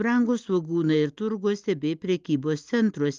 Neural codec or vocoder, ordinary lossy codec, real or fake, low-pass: none; Opus, 32 kbps; real; 7.2 kHz